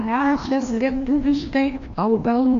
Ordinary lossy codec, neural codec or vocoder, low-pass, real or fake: AAC, 48 kbps; codec, 16 kHz, 1 kbps, FreqCodec, larger model; 7.2 kHz; fake